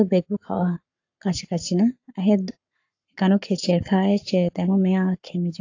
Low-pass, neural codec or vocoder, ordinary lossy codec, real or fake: 7.2 kHz; codec, 44.1 kHz, 7.8 kbps, Pupu-Codec; AAC, 48 kbps; fake